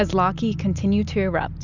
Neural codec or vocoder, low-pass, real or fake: none; 7.2 kHz; real